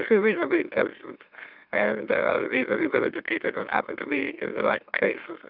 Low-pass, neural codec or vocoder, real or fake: 5.4 kHz; autoencoder, 44.1 kHz, a latent of 192 numbers a frame, MeloTTS; fake